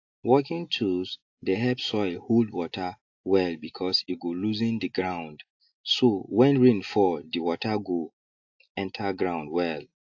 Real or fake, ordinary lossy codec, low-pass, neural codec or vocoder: real; AAC, 48 kbps; 7.2 kHz; none